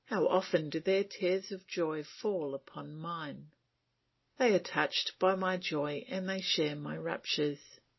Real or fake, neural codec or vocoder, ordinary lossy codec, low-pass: real; none; MP3, 24 kbps; 7.2 kHz